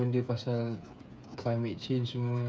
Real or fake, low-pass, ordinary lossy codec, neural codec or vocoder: fake; none; none; codec, 16 kHz, 8 kbps, FreqCodec, smaller model